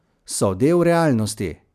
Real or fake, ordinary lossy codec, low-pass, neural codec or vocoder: real; none; 14.4 kHz; none